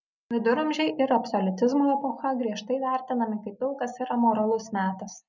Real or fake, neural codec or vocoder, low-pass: real; none; 7.2 kHz